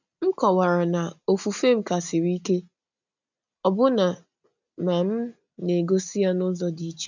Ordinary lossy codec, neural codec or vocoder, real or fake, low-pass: none; none; real; 7.2 kHz